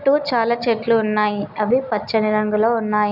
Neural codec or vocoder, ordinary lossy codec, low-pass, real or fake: none; none; 5.4 kHz; real